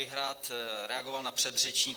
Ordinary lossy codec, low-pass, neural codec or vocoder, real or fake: Opus, 24 kbps; 19.8 kHz; vocoder, 44.1 kHz, 128 mel bands, Pupu-Vocoder; fake